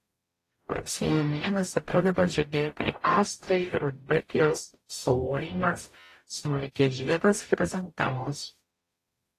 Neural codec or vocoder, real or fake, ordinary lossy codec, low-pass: codec, 44.1 kHz, 0.9 kbps, DAC; fake; AAC, 48 kbps; 14.4 kHz